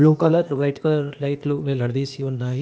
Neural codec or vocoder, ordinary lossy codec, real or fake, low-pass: codec, 16 kHz, 0.8 kbps, ZipCodec; none; fake; none